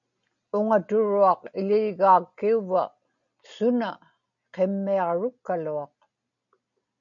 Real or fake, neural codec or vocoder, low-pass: real; none; 7.2 kHz